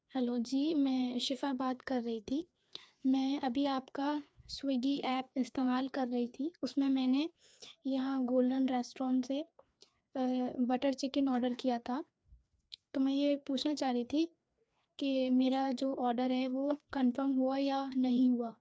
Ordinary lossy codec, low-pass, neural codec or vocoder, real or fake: none; none; codec, 16 kHz, 2 kbps, FreqCodec, larger model; fake